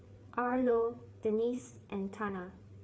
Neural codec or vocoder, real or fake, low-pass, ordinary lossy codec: codec, 16 kHz, 4 kbps, FreqCodec, larger model; fake; none; none